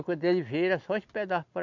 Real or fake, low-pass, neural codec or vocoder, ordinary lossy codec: real; 7.2 kHz; none; none